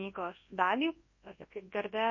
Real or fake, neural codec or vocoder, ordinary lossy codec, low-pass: fake; codec, 24 kHz, 0.9 kbps, WavTokenizer, large speech release; MP3, 32 kbps; 7.2 kHz